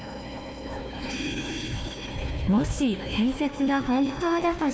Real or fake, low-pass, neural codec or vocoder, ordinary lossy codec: fake; none; codec, 16 kHz, 1 kbps, FunCodec, trained on Chinese and English, 50 frames a second; none